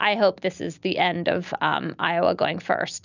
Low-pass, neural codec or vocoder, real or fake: 7.2 kHz; none; real